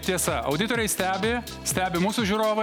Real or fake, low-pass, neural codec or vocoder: real; 19.8 kHz; none